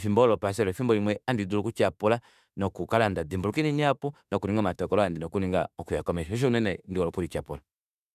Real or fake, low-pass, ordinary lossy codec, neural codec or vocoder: fake; 14.4 kHz; none; autoencoder, 48 kHz, 32 numbers a frame, DAC-VAE, trained on Japanese speech